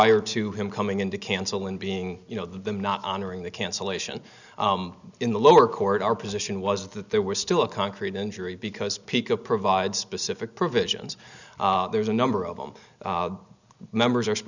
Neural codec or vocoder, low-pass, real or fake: none; 7.2 kHz; real